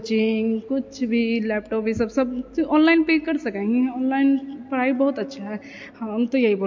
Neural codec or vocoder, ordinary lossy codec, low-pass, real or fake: none; MP3, 48 kbps; 7.2 kHz; real